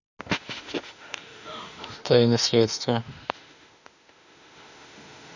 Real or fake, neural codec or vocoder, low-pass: fake; autoencoder, 48 kHz, 32 numbers a frame, DAC-VAE, trained on Japanese speech; 7.2 kHz